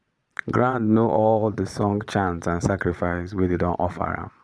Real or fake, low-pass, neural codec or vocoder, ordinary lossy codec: fake; none; vocoder, 22.05 kHz, 80 mel bands, Vocos; none